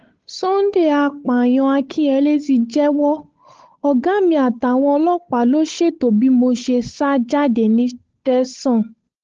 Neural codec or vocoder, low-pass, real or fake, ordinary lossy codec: codec, 16 kHz, 8 kbps, FunCodec, trained on Chinese and English, 25 frames a second; 7.2 kHz; fake; Opus, 16 kbps